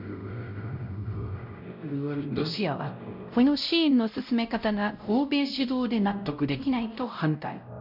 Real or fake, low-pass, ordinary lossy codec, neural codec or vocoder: fake; 5.4 kHz; none; codec, 16 kHz, 0.5 kbps, X-Codec, WavLM features, trained on Multilingual LibriSpeech